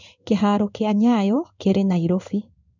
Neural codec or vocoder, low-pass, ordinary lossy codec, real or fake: codec, 16 kHz in and 24 kHz out, 1 kbps, XY-Tokenizer; 7.2 kHz; none; fake